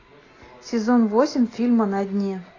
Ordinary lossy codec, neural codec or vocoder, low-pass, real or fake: AAC, 32 kbps; none; 7.2 kHz; real